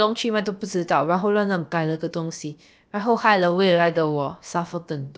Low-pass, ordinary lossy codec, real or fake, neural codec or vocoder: none; none; fake; codec, 16 kHz, about 1 kbps, DyCAST, with the encoder's durations